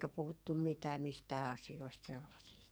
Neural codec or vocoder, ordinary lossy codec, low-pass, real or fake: codec, 44.1 kHz, 2.6 kbps, SNAC; none; none; fake